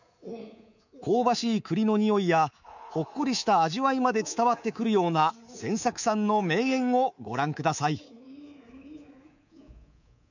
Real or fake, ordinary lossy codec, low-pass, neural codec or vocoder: fake; none; 7.2 kHz; codec, 24 kHz, 3.1 kbps, DualCodec